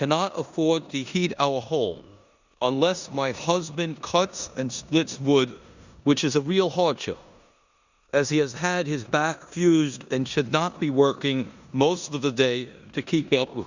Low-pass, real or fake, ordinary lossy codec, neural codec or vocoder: 7.2 kHz; fake; Opus, 64 kbps; codec, 16 kHz in and 24 kHz out, 0.9 kbps, LongCat-Audio-Codec, four codebook decoder